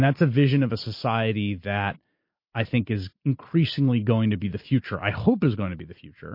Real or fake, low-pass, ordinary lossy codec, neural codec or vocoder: real; 5.4 kHz; MP3, 32 kbps; none